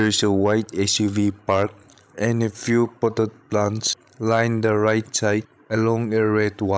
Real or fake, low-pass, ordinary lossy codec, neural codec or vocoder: fake; none; none; codec, 16 kHz, 16 kbps, FreqCodec, larger model